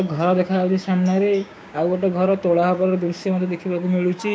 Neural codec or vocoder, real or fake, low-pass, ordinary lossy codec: codec, 16 kHz, 6 kbps, DAC; fake; none; none